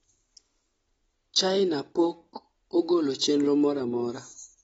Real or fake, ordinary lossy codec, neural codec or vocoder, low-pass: real; AAC, 24 kbps; none; 19.8 kHz